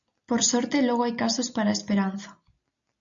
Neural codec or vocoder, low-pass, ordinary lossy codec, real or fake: none; 7.2 kHz; MP3, 64 kbps; real